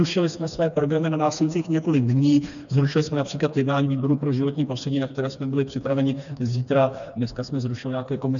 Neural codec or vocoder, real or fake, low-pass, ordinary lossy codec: codec, 16 kHz, 2 kbps, FreqCodec, smaller model; fake; 7.2 kHz; MP3, 96 kbps